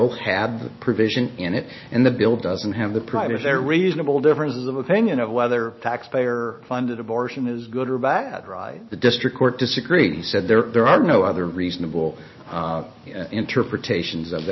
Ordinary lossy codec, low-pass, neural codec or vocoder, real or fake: MP3, 24 kbps; 7.2 kHz; none; real